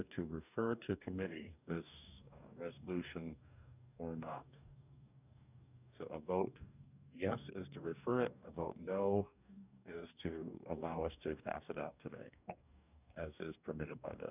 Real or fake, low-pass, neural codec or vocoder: fake; 3.6 kHz; codec, 44.1 kHz, 2.6 kbps, DAC